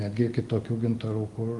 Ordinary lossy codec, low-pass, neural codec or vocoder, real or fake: Opus, 24 kbps; 10.8 kHz; none; real